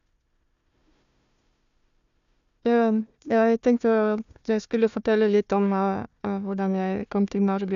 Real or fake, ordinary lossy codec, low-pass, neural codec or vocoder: fake; none; 7.2 kHz; codec, 16 kHz, 1 kbps, FunCodec, trained on Chinese and English, 50 frames a second